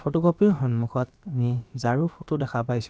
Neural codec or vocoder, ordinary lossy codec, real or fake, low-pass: codec, 16 kHz, about 1 kbps, DyCAST, with the encoder's durations; none; fake; none